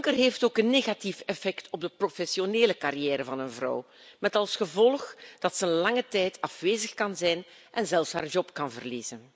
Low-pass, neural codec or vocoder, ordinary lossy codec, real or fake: none; none; none; real